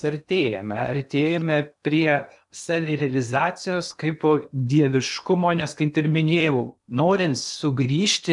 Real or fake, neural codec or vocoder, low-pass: fake; codec, 16 kHz in and 24 kHz out, 0.8 kbps, FocalCodec, streaming, 65536 codes; 10.8 kHz